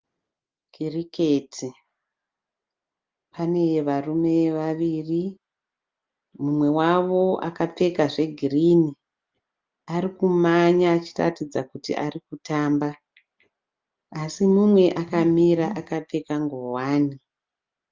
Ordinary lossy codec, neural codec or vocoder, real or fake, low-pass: Opus, 24 kbps; none; real; 7.2 kHz